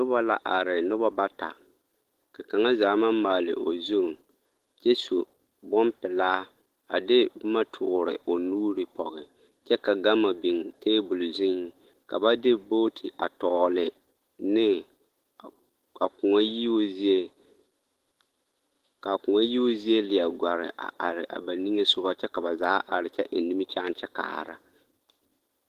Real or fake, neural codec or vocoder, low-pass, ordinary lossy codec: fake; autoencoder, 48 kHz, 128 numbers a frame, DAC-VAE, trained on Japanese speech; 14.4 kHz; Opus, 16 kbps